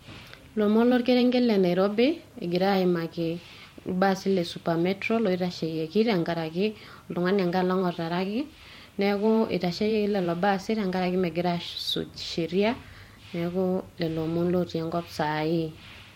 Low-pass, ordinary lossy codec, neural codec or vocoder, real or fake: 19.8 kHz; MP3, 64 kbps; vocoder, 44.1 kHz, 128 mel bands every 512 samples, BigVGAN v2; fake